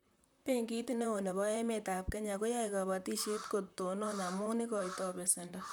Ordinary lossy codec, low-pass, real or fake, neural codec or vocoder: none; none; fake; vocoder, 44.1 kHz, 128 mel bands, Pupu-Vocoder